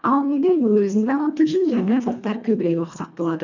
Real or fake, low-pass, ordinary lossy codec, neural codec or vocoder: fake; 7.2 kHz; none; codec, 24 kHz, 1.5 kbps, HILCodec